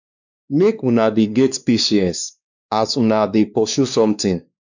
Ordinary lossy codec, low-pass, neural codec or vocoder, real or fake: none; 7.2 kHz; codec, 16 kHz, 2 kbps, X-Codec, WavLM features, trained on Multilingual LibriSpeech; fake